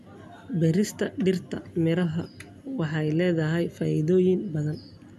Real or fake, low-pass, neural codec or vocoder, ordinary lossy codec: real; 14.4 kHz; none; none